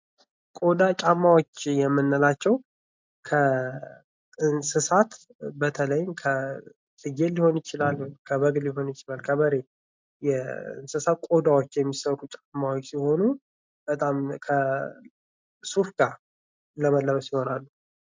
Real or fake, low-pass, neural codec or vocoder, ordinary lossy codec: real; 7.2 kHz; none; MP3, 64 kbps